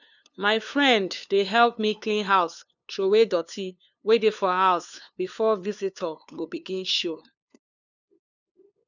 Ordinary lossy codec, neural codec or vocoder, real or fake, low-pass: none; codec, 16 kHz, 2 kbps, FunCodec, trained on LibriTTS, 25 frames a second; fake; 7.2 kHz